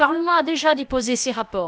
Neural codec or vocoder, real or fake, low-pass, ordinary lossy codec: codec, 16 kHz, about 1 kbps, DyCAST, with the encoder's durations; fake; none; none